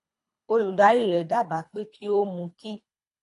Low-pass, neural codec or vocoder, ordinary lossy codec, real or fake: 10.8 kHz; codec, 24 kHz, 3 kbps, HILCodec; AAC, 48 kbps; fake